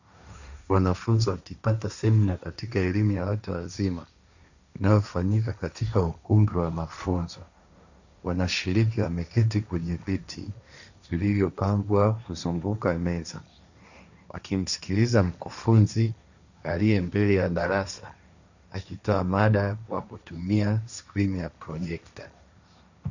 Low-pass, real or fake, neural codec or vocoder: 7.2 kHz; fake; codec, 16 kHz, 1.1 kbps, Voila-Tokenizer